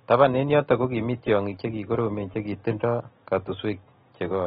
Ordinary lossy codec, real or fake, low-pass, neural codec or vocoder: AAC, 16 kbps; real; 7.2 kHz; none